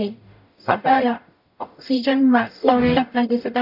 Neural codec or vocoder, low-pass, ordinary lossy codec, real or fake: codec, 44.1 kHz, 0.9 kbps, DAC; 5.4 kHz; none; fake